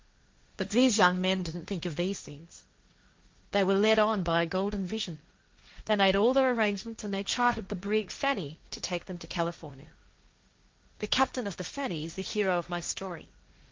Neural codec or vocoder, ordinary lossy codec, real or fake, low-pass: codec, 16 kHz, 1.1 kbps, Voila-Tokenizer; Opus, 32 kbps; fake; 7.2 kHz